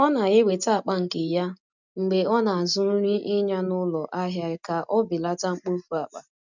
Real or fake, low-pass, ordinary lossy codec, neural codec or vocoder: real; 7.2 kHz; none; none